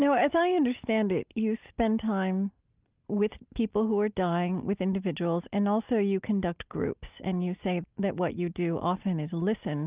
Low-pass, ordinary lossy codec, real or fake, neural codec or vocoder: 3.6 kHz; Opus, 24 kbps; real; none